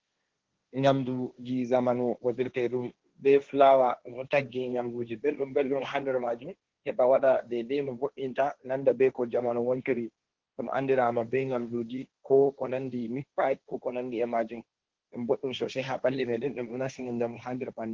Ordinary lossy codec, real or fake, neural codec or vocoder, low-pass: Opus, 16 kbps; fake; codec, 16 kHz, 1.1 kbps, Voila-Tokenizer; 7.2 kHz